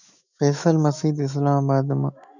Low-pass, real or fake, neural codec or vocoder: 7.2 kHz; fake; autoencoder, 48 kHz, 128 numbers a frame, DAC-VAE, trained on Japanese speech